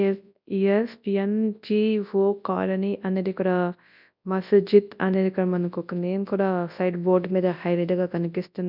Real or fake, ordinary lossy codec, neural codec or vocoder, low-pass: fake; none; codec, 24 kHz, 0.9 kbps, WavTokenizer, large speech release; 5.4 kHz